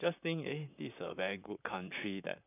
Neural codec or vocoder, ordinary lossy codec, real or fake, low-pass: vocoder, 44.1 kHz, 128 mel bands, Pupu-Vocoder; none; fake; 3.6 kHz